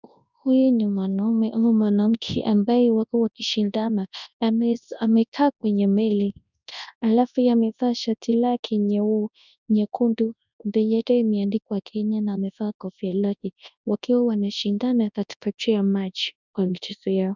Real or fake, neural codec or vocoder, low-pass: fake; codec, 24 kHz, 0.9 kbps, WavTokenizer, large speech release; 7.2 kHz